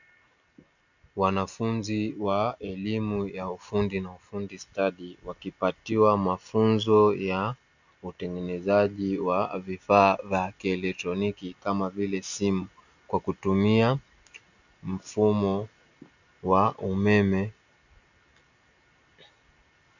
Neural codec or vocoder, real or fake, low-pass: none; real; 7.2 kHz